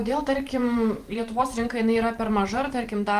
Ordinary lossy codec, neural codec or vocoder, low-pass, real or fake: Opus, 24 kbps; vocoder, 44.1 kHz, 128 mel bands every 512 samples, BigVGAN v2; 14.4 kHz; fake